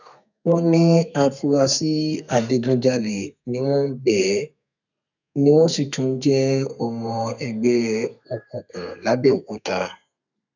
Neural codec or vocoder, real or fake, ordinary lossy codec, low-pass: codec, 32 kHz, 1.9 kbps, SNAC; fake; none; 7.2 kHz